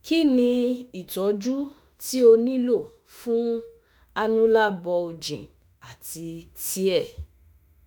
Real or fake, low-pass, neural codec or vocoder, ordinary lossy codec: fake; none; autoencoder, 48 kHz, 32 numbers a frame, DAC-VAE, trained on Japanese speech; none